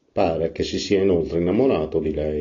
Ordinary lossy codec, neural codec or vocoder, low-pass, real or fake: AAC, 32 kbps; none; 7.2 kHz; real